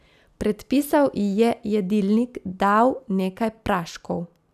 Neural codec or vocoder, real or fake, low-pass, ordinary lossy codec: none; real; 14.4 kHz; none